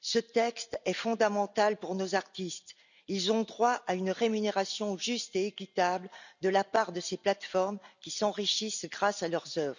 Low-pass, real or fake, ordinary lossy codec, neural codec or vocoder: 7.2 kHz; real; none; none